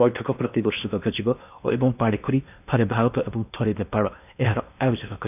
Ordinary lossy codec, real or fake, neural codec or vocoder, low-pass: none; fake; codec, 16 kHz in and 24 kHz out, 0.8 kbps, FocalCodec, streaming, 65536 codes; 3.6 kHz